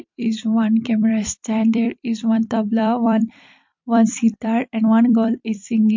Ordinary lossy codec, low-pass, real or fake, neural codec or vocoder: MP3, 48 kbps; 7.2 kHz; fake; vocoder, 44.1 kHz, 128 mel bands every 256 samples, BigVGAN v2